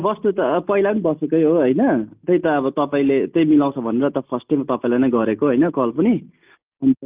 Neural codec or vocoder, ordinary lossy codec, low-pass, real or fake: none; Opus, 32 kbps; 3.6 kHz; real